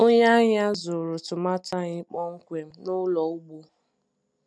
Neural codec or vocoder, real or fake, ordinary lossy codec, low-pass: none; real; none; none